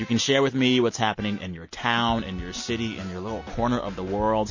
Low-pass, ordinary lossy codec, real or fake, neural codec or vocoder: 7.2 kHz; MP3, 32 kbps; real; none